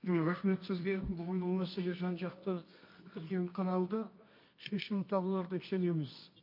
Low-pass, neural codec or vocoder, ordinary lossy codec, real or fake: 5.4 kHz; codec, 24 kHz, 0.9 kbps, WavTokenizer, medium music audio release; MP3, 32 kbps; fake